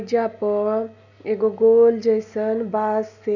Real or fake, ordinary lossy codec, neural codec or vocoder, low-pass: real; none; none; 7.2 kHz